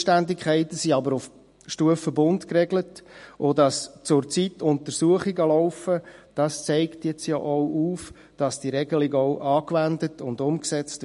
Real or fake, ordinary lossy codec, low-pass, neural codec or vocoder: real; MP3, 48 kbps; 14.4 kHz; none